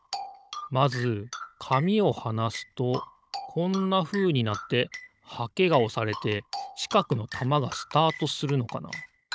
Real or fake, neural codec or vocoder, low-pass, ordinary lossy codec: fake; codec, 16 kHz, 16 kbps, FunCodec, trained on Chinese and English, 50 frames a second; none; none